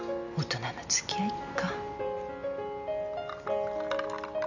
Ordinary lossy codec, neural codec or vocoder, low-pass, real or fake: none; none; 7.2 kHz; real